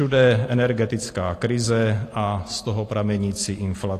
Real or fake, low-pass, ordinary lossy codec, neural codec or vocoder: real; 14.4 kHz; AAC, 48 kbps; none